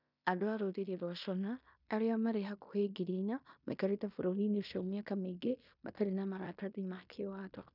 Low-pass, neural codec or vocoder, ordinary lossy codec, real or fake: 5.4 kHz; codec, 16 kHz in and 24 kHz out, 0.9 kbps, LongCat-Audio-Codec, fine tuned four codebook decoder; none; fake